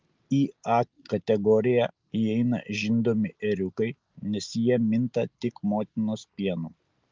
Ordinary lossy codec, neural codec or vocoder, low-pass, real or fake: Opus, 32 kbps; none; 7.2 kHz; real